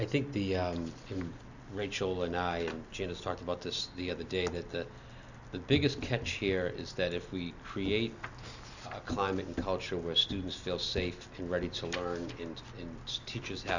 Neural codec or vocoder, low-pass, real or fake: none; 7.2 kHz; real